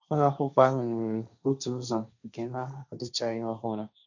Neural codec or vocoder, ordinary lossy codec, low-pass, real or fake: codec, 16 kHz, 1.1 kbps, Voila-Tokenizer; none; 7.2 kHz; fake